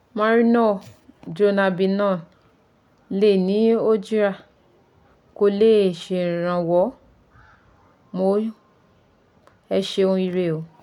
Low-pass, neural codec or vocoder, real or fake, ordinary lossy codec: 19.8 kHz; none; real; none